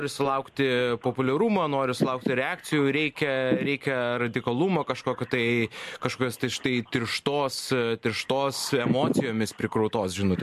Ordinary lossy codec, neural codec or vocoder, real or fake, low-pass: MP3, 64 kbps; none; real; 14.4 kHz